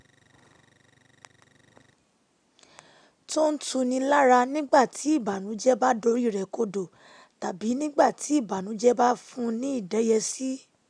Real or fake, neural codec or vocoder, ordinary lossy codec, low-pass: real; none; none; 9.9 kHz